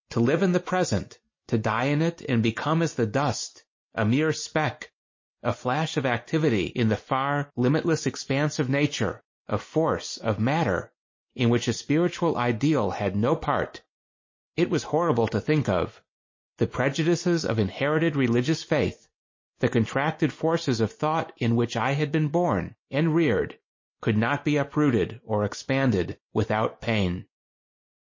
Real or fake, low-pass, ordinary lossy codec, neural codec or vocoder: real; 7.2 kHz; MP3, 32 kbps; none